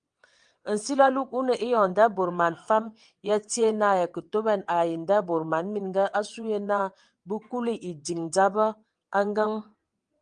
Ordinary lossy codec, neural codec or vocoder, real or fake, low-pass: Opus, 32 kbps; vocoder, 22.05 kHz, 80 mel bands, WaveNeXt; fake; 9.9 kHz